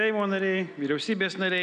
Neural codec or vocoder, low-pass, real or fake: none; 9.9 kHz; real